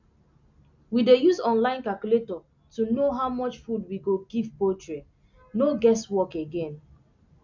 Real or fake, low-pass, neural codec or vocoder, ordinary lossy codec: real; 7.2 kHz; none; none